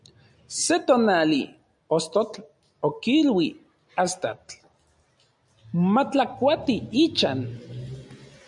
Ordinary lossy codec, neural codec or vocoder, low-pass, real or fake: MP3, 96 kbps; none; 10.8 kHz; real